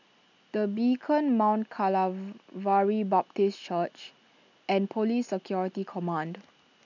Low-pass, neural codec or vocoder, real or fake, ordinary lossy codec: 7.2 kHz; none; real; none